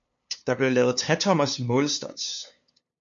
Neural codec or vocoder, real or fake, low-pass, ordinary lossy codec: codec, 16 kHz, 2 kbps, FunCodec, trained on LibriTTS, 25 frames a second; fake; 7.2 kHz; MP3, 48 kbps